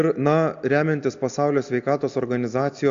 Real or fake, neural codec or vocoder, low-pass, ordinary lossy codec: real; none; 7.2 kHz; AAC, 64 kbps